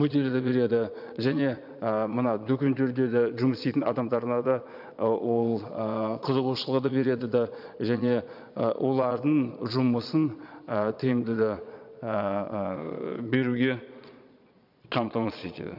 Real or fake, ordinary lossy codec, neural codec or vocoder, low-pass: fake; none; vocoder, 22.05 kHz, 80 mel bands, Vocos; 5.4 kHz